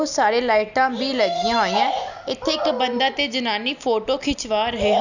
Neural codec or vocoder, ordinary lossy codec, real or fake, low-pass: none; none; real; 7.2 kHz